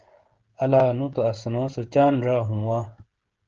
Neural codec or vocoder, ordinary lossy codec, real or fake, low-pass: codec, 16 kHz, 16 kbps, FreqCodec, smaller model; Opus, 16 kbps; fake; 7.2 kHz